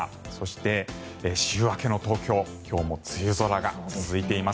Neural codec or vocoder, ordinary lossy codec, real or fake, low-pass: none; none; real; none